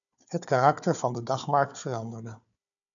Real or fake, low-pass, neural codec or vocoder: fake; 7.2 kHz; codec, 16 kHz, 4 kbps, FunCodec, trained on Chinese and English, 50 frames a second